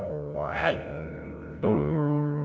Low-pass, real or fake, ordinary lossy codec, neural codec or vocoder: none; fake; none; codec, 16 kHz, 0.5 kbps, FunCodec, trained on LibriTTS, 25 frames a second